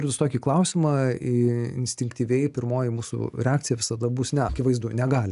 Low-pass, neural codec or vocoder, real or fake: 10.8 kHz; none; real